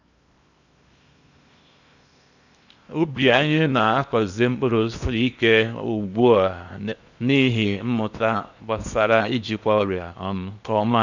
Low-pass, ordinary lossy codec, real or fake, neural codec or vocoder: 7.2 kHz; none; fake; codec, 16 kHz in and 24 kHz out, 0.8 kbps, FocalCodec, streaming, 65536 codes